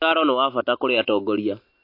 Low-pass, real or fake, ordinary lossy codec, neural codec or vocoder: 5.4 kHz; real; MP3, 32 kbps; none